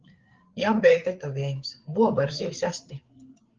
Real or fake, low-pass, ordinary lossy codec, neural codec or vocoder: fake; 7.2 kHz; Opus, 16 kbps; codec, 16 kHz, 4 kbps, FreqCodec, larger model